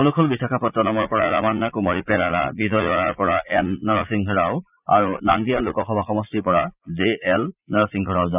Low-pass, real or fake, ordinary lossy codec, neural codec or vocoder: 3.6 kHz; fake; none; vocoder, 44.1 kHz, 80 mel bands, Vocos